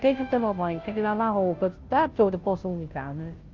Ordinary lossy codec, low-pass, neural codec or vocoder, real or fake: Opus, 24 kbps; 7.2 kHz; codec, 16 kHz, 0.5 kbps, FunCodec, trained on Chinese and English, 25 frames a second; fake